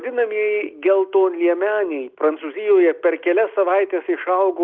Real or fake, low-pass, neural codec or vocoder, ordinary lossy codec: real; 7.2 kHz; none; Opus, 24 kbps